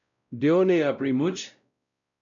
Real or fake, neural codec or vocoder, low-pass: fake; codec, 16 kHz, 0.5 kbps, X-Codec, WavLM features, trained on Multilingual LibriSpeech; 7.2 kHz